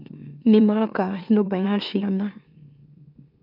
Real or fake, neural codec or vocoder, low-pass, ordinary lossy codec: fake; autoencoder, 44.1 kHz, a latent of 192 numbers a frame, MeloTTS; 5.4 kHz; none